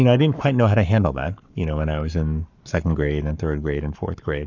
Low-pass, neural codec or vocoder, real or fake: 7.2 kHz; codec, 44.1 kHz, 7.8 kbps, Pupu-Codec; fake